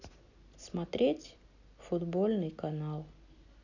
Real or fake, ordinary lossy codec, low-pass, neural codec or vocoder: real; none; 7.2 kHz; none